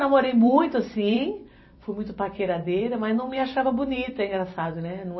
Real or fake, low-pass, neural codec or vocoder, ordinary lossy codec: fake; 7.2 kHz; vocoder, 44.1 kHz, 128 mel bands every 256 samples, BigVGAN v2; MP3, 24 kbps